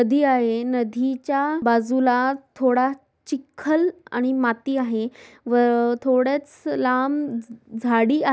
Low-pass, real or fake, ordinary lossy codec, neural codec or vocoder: none; real; none; none